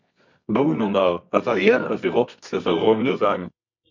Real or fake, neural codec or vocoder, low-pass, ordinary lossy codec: fake; codec, 24 kHz, 0.9 kbps, WavTokenizer, medium music audio release; 7.2 kHz; MP3, 64 kbps